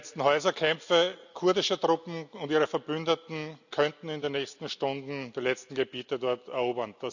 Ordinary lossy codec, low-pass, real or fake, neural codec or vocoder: none; 7.2 kHz; real; none